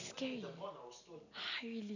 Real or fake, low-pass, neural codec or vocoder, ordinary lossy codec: real; 7.2 kHz; none; none